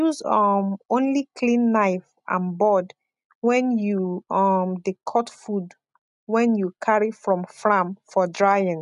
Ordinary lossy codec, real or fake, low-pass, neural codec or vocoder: none; real; 10.8 kHz; none